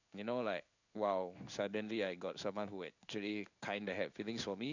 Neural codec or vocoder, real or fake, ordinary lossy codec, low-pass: codec, 16 kHz in and 24 kHz out, 1 kbps, XY-Tokenizer; fake; none; 7.2 kHz